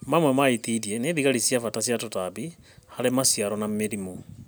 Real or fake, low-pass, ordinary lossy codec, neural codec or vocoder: real; none; none; none